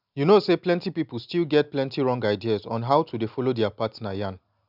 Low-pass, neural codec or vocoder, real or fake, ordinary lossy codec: 5.4 kHz; none; real; none